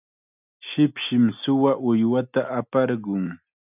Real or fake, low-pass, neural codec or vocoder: real; 3.6 kHz; none